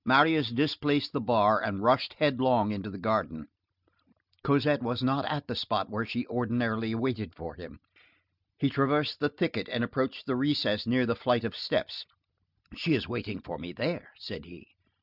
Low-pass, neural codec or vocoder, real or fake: 5.4 kHz; none; real